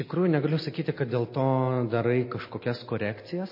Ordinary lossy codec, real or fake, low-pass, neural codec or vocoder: MP3, 24 kbps; real; 5.4 kHz; none